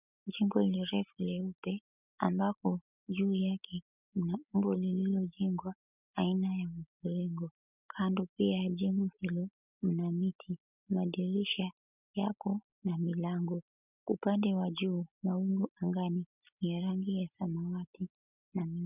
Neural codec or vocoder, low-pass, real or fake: none; 3.6 kHz; real